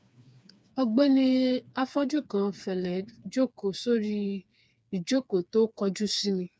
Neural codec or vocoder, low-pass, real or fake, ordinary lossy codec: codec, 16 kHz, 4 kbps, FreqCodec, smaller model; none; fake; none